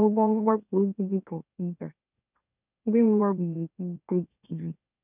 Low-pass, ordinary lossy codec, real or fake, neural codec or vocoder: 3.6 kHz; none; fake; autoencoder, 44.1 kHz, a latent of 192 numbers a frame, MeloTTS